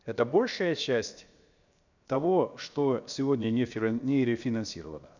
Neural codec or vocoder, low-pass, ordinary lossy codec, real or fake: codec, 16 kHz, 0.7 kbps, FocalCodec; 7.2 kHz; none; fake